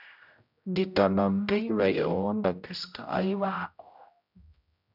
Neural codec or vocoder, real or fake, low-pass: codec, 16 kHz, 0.5 kbps, X-Codec, HuBERT features, trained on general audio; fake; 5.4 kHz